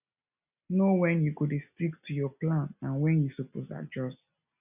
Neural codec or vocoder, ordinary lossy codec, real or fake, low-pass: none; none; real; 3.6 kHz